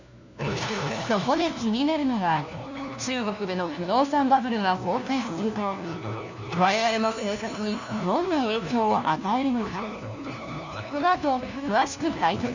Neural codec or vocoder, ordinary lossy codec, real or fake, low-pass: codec, 16 kHz, 1 kbps, FunCodec, trained on LibriTTS, 50 frames a second; none; fake; 7.2 kHz